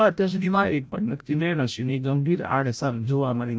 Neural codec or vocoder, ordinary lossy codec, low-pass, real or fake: codec, 16 kHz, 0.5 kbps, FreqCodec, larger model; none; none; fake